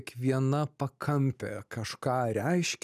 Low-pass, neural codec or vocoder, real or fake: 14.4 kHz; none; real